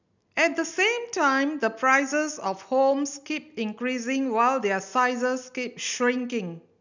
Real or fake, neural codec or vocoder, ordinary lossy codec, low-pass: real; none; none; 7.2 kHz